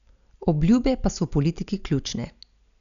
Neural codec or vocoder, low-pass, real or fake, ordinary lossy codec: none; 7.2 kHz; real; none